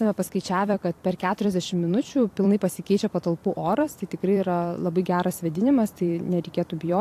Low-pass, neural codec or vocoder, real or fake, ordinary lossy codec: 14.4 kHz; vocoder, 44.1 kHz, 128 mel bands every 256 samples, BigVGAN v2; fake; AAC, 64 kbps